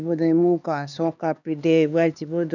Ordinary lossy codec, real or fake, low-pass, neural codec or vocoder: none; fake; 7.2 kHz; codec, 16 kHz, 2 kbps, X-Codec, HuBERT features, trained on LibriSpeech